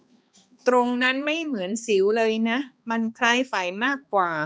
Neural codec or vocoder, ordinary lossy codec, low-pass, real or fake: codec, 16 kHz, 2 kbps, X-Codec, HuBERT features, trained on balanced general audio; none; none; fake